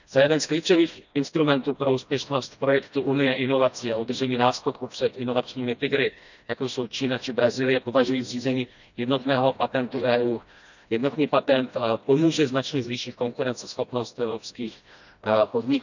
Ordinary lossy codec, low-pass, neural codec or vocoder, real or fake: none; 7.2 kHz; codec, 16 kHz, 1 kbps, FreqCodec, smaller model; fake